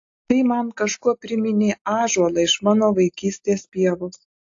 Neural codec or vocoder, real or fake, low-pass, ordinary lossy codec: none; real; 7.2 kHz; AAC, 48 kbps